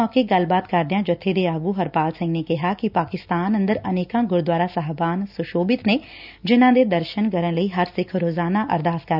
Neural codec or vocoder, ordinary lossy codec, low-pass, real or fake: none; none; 5.4 kHz; real